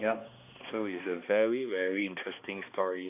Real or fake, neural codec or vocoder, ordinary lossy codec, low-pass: fake; codec, 16 kHz, 2 kbps, X-Codec, HuBERT features, trained on balanced general audio; none; 3.6 kHz